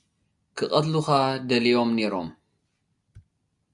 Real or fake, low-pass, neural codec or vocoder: real; 10.8 kHz; none